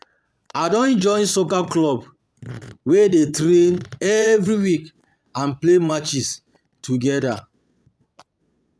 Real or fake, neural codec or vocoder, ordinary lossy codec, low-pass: fake; vocoder, 22.05 kHz, 80 mel bands, Vocos; none; none